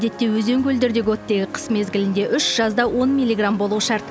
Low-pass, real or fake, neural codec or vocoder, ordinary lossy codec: none; real; none; none